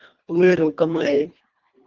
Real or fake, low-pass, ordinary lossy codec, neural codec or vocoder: fake; 7.2 kHz; Opus, 32 kbps; codec, 24 kHz, 1.5 kbps, HILCodec